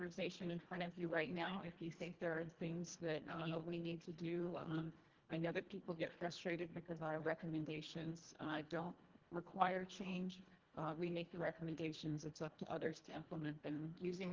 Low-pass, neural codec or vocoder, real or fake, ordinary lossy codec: 7.2 kHz; codec, 24 kHz, 1.5 kbps, HILCodec; fake; Opus, 16 kbps